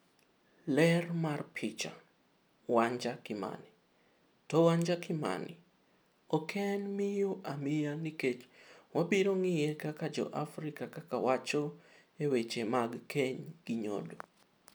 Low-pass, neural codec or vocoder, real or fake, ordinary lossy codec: none; none; real; none